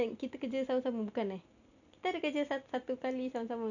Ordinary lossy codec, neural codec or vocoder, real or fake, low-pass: none; none; real; 7.2 kHz